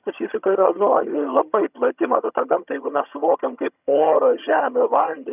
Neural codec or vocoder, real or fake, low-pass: vocoder, 22.05 kHz, 80 mel bands, HiFi-GAN; fake; 3.6 kHz